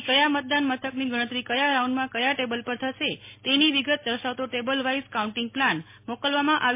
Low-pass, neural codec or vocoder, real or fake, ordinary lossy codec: 3.6 kHz; none; real; MP3, 24 kbps